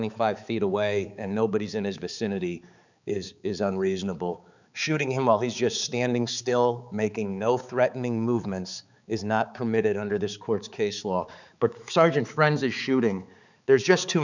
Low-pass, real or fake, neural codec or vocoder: 7.2 kHz; fake; codec, 16 kHz, 4 kbps, X-Codec, HuBERT features, trained on balanced general audio